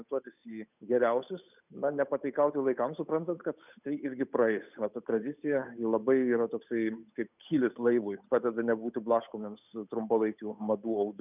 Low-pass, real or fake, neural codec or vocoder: 3.6 kHz; fake; codec, 16 kHz, 8 kbps, FunCodec, trained on Chinese and English, 25 frames a second